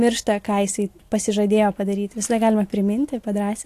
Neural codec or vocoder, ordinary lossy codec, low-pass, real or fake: none; AAC, 64 kbps; 14.4 kHz; real